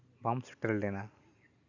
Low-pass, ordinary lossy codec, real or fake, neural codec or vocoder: 7.2 kHz; none; real; none